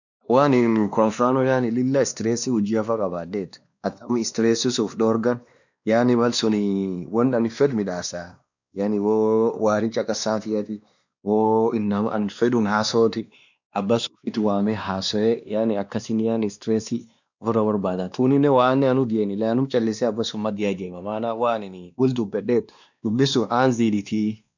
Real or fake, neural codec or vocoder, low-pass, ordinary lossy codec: fake; codec, 16 kHz, 2 kbps, X-Codec, WavLM features, trained on Multilingual LibriSpeech; 7.2 kHz; none